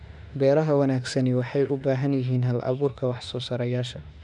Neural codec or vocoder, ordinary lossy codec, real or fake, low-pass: autoencoder, 48 kHz, 32 numbers a frame, DAC-VAE, trained on Japanese speech; none; fake; 10.8 kHz